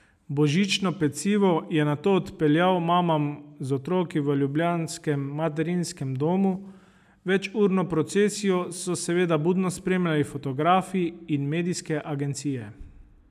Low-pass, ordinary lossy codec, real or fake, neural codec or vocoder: 14.4 kHz; none; real; none